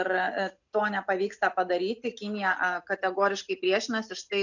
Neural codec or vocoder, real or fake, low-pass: none; real; 7.2 kHz